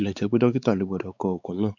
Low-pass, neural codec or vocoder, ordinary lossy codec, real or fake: 7.2 kHz; codec, 16 kHz, 4 kbps, X-Codec, WavLM features, trained on Multilingual LibriSpeech; none; fake